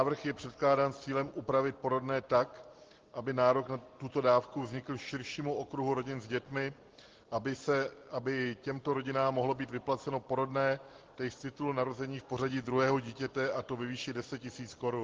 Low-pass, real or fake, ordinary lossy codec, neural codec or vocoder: 7.2 kHz; real; Opus, 16 kbps; none